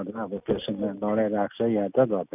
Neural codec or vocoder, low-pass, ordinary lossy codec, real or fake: none; 3.6 kHz; none; real